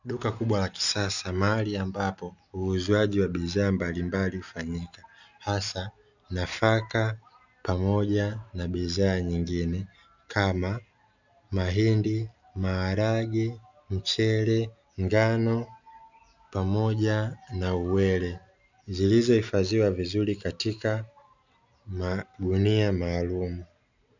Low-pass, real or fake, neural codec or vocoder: 7.2 kHz; real; none